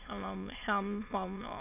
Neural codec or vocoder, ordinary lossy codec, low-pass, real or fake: autoencoder, 22.05 kHz, a latent of 192 numbers a frame, VITS, trained on many speakers; none; 3.6 kHz; fake